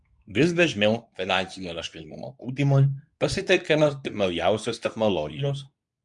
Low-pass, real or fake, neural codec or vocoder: 10.8 kHz; fake; codec, 24 kHz, 0.9 kbps, WavTokenizer, medium speech release version 2